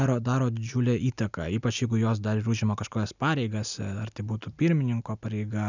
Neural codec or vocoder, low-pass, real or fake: none; 7.2 kHz; real